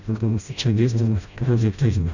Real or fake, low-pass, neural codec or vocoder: fake; 7.2 kHz; codec, 16 kHz, 0.5 kbps, FreqCodec, smaller model